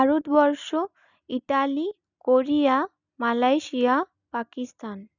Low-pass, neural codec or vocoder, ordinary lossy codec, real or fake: 7.2 kHz; none; none; real